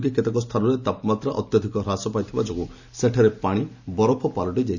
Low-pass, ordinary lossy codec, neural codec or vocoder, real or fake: 7.2 kHz; none; none; real